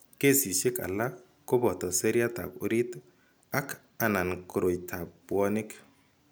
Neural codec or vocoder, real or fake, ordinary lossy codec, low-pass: none; real; none; none